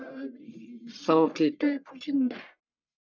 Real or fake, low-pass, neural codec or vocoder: fake; 7.2 kHz; codec, 44.1 kHz, 1.7 kbps, Pupu-Codec